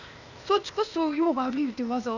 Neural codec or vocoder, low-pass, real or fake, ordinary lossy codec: codec, 16 kHz, 0.8 kbps, ZipCodec; 7.2 kHz; fake; none